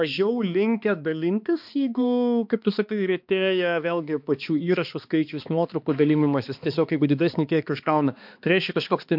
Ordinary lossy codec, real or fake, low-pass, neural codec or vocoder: MP3, 48 kbps; fake; 5.4 kHz; codec, 16 kHz, 2 kbps, X-Codec, HuBERT features, trained on balanced general audio